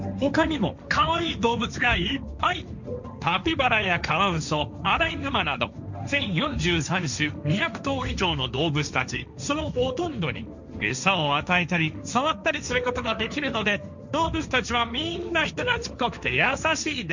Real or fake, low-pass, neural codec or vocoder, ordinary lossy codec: fake; 7.2 kHz; codec, 16 kHz, 1.1 kbps, Voila-Tokenizer; none